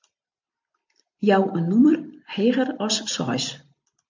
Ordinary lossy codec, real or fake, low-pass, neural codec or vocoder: MP3, 48 kbps; real; 7.2 kHz; none